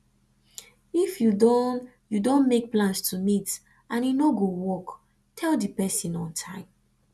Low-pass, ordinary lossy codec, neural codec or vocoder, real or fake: none; none; none; real